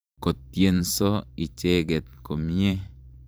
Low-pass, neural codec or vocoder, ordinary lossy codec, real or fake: none; vocoder, 44.1 kHz, 128 mel bands every 512 samples, BigVGAN v2; none; fake